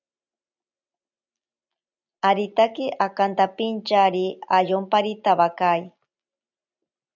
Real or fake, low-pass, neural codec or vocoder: real; 7.2 kHz; none